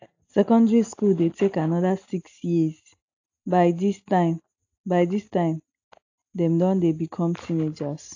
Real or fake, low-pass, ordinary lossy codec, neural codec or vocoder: real; 7.2 kHz; AAC, 48 kbps; none